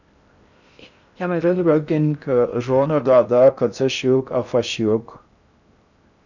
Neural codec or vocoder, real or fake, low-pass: codec, 16 kHz in and 24 kHz out, 0.6 kbps, FocalCodec, streaming, 2048 codes; fake; 7.2 kHz